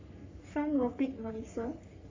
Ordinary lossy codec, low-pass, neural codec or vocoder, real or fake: MP3, 64 kbps; 7.2 kHz; codec, 44.1 kHz, 3.4 kbps, Pupu-Codec; fake